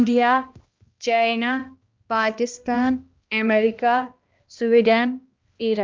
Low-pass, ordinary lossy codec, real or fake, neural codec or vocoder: 7.2 kHz; Opus, 24 kbps; fake; codec, 16 kHz, 1 kbps, X-Codec, HuBERT features, trained on balanced general audio